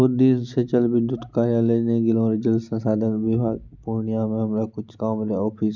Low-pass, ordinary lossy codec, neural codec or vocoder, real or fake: 7.2 kHz; none; none; real